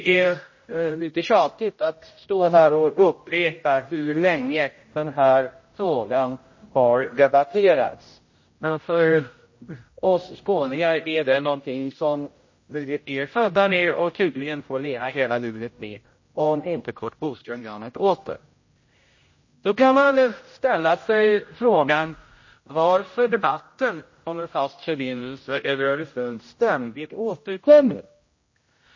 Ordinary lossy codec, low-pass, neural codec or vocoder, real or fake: MP3, 32 kbps; 7.2 kHz; codec, 16 kHz, 0.5 kbps, X-Codec, HuBERT features, trained on general audio; fake